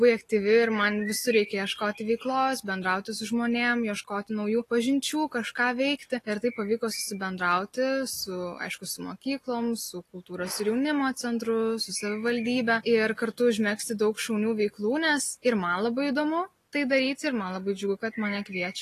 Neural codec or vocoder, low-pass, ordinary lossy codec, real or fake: none; 14.4 kHz; AAC, 48 kbps; real